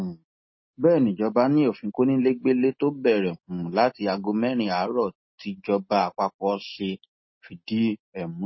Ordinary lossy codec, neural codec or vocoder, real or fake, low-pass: MP3, 24 kbps; none; real; 7.2 kHz